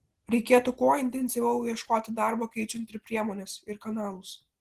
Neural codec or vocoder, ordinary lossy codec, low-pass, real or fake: none; Opus, 16 kbps; 14.4 kHz; real